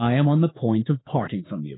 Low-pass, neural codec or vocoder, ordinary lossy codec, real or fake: 7.2 kHz; autoencoder, 48 kHz, 32 numbers a frame, DAC-VAE, trained on Japanese speech; AAC, 16 kbps; fake